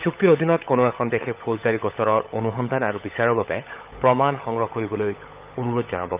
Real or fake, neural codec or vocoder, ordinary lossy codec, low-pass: fake; codec, 16 kHz, 16 kbps, FunCodec, trained on LibriTTS, 50 frames a second; Opus, 24 kbps; 3.6 kHz